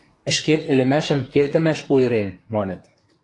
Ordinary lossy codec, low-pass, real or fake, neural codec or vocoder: AAC, 48 kbps; 10.8 kHz; fake; codec, 24 kHz, 1 kbps, SNAC